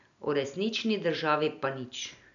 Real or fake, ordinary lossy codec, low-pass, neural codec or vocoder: real; none; 7.2 kHz; none